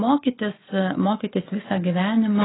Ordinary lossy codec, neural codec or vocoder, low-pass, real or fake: AAC, 16 kbps; none; 7.2 kHz; real